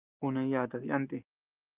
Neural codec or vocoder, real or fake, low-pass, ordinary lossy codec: none; real; 3.6 kHz; Opus, 32 kbps